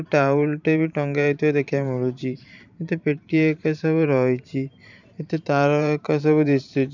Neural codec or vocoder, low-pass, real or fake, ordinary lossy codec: vocoder, 44.1 kHz, 128 mel bands every 512 samples, BigVGAN v2; 7.2 kHz; fake; none